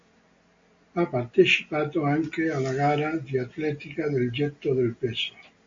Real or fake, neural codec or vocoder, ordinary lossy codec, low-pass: real; none; MP3, 48 kbps; 7.2 kHz